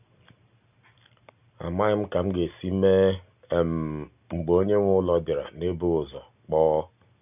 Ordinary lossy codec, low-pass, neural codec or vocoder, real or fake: none; 3.6 kHz; none; real